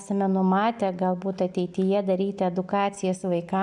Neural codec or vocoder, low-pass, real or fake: none; 10.8 kHz; real